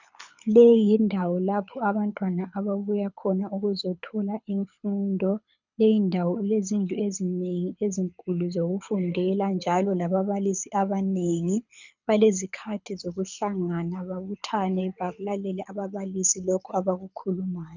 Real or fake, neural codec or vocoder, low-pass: fake; codec, 24 kHz, 6 kbps, HILCodec; 7.2 kHz